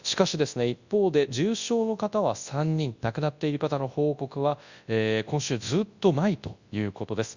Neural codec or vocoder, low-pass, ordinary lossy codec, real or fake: codec, 24 kHz, 0.9 kbps, WavTokenizer, large speech release; 7.2 kHz; Opus, 64 kbps; fake